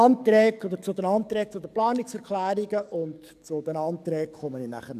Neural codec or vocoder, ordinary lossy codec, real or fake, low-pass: codec, 44.1 kHz, 7.8 kbps, DAC; none; fake; 14.4 kHz